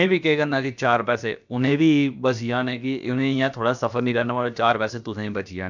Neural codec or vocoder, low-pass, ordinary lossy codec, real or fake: codec, 16 kHz, about 1 kbps, DyCAST, with the encoder's durations; 7.2 kHz; none; fake